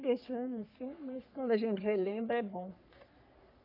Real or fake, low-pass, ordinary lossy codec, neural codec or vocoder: fake; 5.4 kHz; AAC, 32 kbps; codec, 44.1 kHz, 3.4 kbps, Pupu-Codec